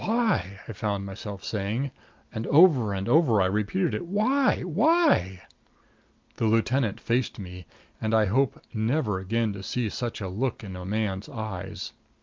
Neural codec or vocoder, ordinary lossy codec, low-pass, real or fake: none; Opus, 24 kbps; 7.2 kHz; real